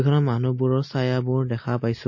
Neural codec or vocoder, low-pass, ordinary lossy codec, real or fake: none; 7.2 kHz; MP3, 32 kbps; real